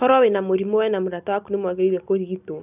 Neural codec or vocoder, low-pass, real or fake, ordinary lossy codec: none; 3.6 kHz; real; none